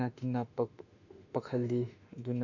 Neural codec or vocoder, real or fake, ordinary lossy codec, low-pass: autoencoder, 48 kHz, 32 numbers a frame, DAC-VAE, trained on Japanese speech; fake; none; 7.2 kHz